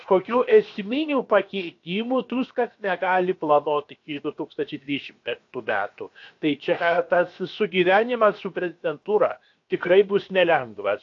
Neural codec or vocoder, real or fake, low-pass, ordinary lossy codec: codec, 16 kHz, 0.7 kbps, FocalCodec; fake; 7.2 kHz; AAC, 48 kbps